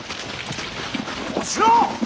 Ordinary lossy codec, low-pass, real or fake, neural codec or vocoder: none; none; real; none